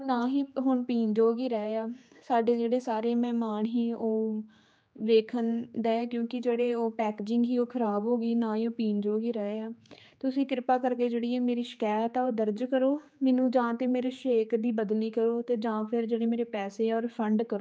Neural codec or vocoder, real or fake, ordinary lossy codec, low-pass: codec, 16 kHz, 4 kbps, X-Codec, HuBERT features, trained on general audio; fake; none; none